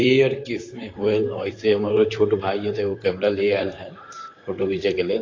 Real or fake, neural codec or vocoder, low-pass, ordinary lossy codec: fake; vocoder, 44.1 kHz, 128 mel bands, Pupu-Vocoder; 7.2 kHz; AAC, 32 kbps